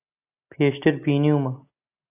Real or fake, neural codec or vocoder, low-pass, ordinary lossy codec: real; none; 3.6 kHz; AAC, 32 kbps